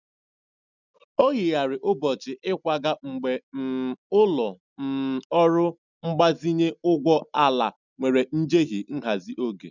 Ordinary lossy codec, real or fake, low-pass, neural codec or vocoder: none; real; 7.2 kHz; none